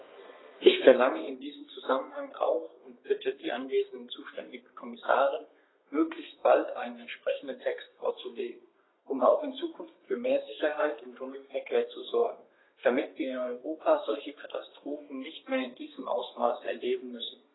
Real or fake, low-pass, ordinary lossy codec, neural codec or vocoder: fake; 7.2 kHz; AAC, 16 kbps; codec, 32 kHz, 1.9 kbps, SNAC